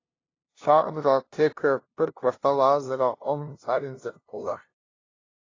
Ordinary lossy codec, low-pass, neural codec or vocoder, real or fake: AAC, 32 kbps; 7.2 kHz; codec, 16 kHz, 0.5 kbps, FunCodec, trained on LibriTTS, 25 frames a second; fake